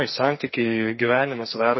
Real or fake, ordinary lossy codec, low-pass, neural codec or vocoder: fake; MP3, 24 kbps; 7.2 kHz; codec, 44.1 kHz, 2.6 kbps, SNAC